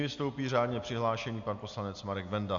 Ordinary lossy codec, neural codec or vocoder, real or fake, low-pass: MP3, 96 kbps; none; real; 7.2 kHz